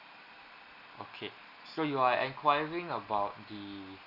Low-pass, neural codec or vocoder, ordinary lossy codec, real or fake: 5.4 kHz; none; none; real